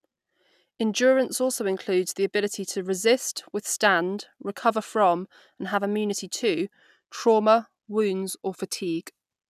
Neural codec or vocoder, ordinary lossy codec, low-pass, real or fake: none; none; 14.4 kHz; real